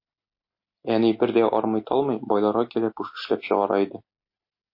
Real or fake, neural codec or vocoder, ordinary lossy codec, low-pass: real; none; MP3, 32 kbps; 5.4 kHz